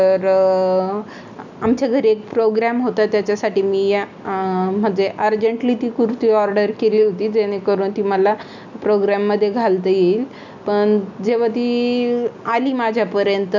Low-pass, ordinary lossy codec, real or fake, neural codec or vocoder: 7.2 kHz; none; real; none